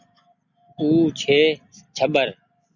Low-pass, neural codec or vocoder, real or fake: 7.2 kHz; none; real